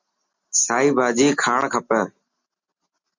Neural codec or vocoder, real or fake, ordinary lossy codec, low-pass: none; real; MP3, 64 kbps; 7.2 kHz